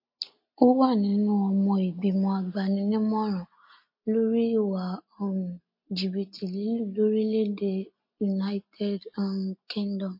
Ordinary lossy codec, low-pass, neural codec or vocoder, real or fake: MP3, 32 kbps; 5.4 kHz; none; real